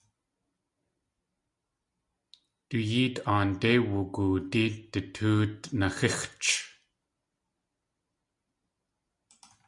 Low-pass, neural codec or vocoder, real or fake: 10.8 kHz; none; real